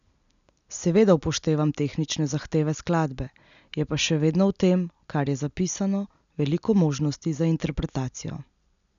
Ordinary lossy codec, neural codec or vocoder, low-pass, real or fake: none; none; 7.2 kHz; real